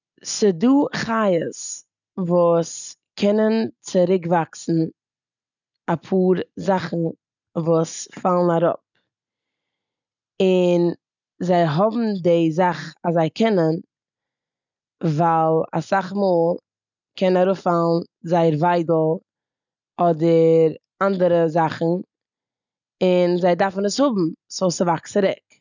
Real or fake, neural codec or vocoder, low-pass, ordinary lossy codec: real; none; 7.2 kHz; none